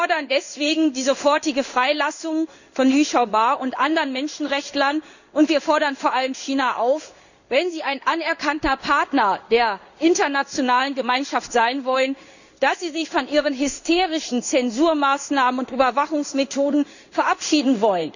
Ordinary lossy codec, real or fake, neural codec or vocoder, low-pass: none; fake; codec, 16 kHz in and 24 kHz out, 1 kbps, XY-Tokenizer; 7.2 kHz